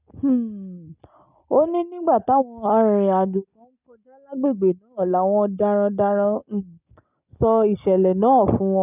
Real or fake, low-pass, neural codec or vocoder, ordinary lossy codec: real; 3.6 kHz; none; none